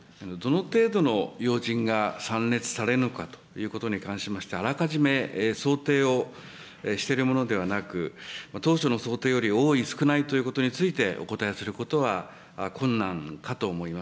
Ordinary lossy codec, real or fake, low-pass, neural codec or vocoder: none; real; none; none